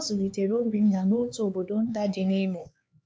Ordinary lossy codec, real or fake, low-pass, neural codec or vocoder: none; fake; none; codec, 16 kHz, 4 kbps, X-Codec, HuBERT features, trained on LibriSpeech